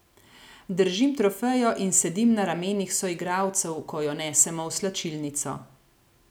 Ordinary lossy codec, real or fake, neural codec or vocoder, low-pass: none; real; none; none